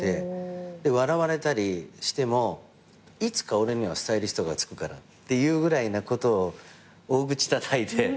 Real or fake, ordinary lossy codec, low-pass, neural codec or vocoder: real; none; none; none